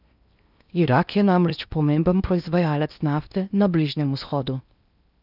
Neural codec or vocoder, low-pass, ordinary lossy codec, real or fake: codec, 16 kHz in and 24 kHz out, 0.8 kbps, FocalCodec, streaming, 65536 codes; 5.4 kHz; none; fake